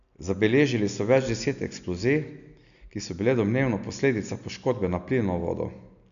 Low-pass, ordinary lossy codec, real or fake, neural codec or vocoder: 7.2 kHz; none; real; none